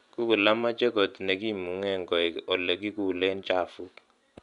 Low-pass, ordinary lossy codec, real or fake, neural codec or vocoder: 10.8 kHz; none; fake; vocoder, 24 kHz, 100 mel bands, Vocos